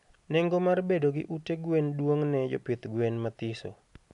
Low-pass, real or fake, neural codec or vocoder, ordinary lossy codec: 10.8 kHz; real; none; none